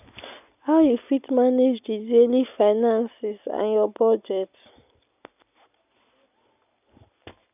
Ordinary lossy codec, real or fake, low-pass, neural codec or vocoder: none; real; 3.6 kHz; none